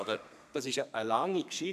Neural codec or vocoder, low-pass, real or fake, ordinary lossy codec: codec, 32 kHz, 1.9 kbps, SNAC; 14.4 kHz; fake; none